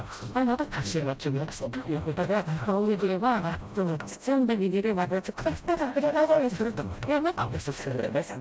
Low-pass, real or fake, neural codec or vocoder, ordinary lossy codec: none; fake; codec, 16 kHz, 0.5 kbps, FreqCodec, smaller model; none